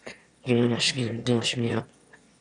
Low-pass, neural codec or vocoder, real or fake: 9.9 kHz; autoencoder, 22.05 kHz, a latent of 192 numbers a frame, VITS, trained on one speaker; fake